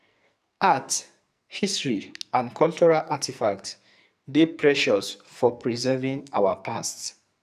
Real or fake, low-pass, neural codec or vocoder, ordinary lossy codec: fake; 14.4 kHz; codec, 44.1 kHz, 2.6 kbps, SNAC; none